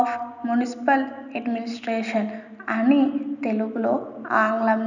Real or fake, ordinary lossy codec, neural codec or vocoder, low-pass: real; none; none; 7.2 kHz